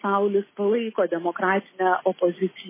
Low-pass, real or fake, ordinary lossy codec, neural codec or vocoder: 3.6 kHz; real; MP3, 16 kbps; none